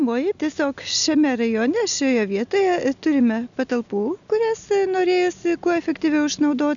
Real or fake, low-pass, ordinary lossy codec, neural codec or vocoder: real; 7.2 kHz; MP3, 96 kbps; none